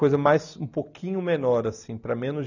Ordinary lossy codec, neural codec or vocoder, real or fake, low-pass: none; none; real; 7.2 kHz